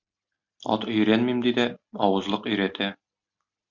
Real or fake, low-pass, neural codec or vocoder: real; 7.2 kHz; none